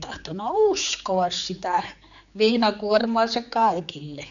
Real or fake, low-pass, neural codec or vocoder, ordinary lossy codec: fake; 7.2 kHz; codec, 16 kHz, 4 kbps, X-Codec, HuBERT features, trained on general audio; none